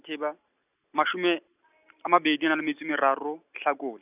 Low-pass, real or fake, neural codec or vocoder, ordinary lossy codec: 3.6 kHz; real; none; none